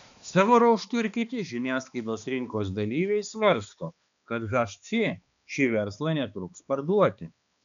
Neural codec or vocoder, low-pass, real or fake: codec, 16 kHz, 2 kbps, X-Codec, HuBERT features, trained on balanced general audio; 7.2 kHz; fake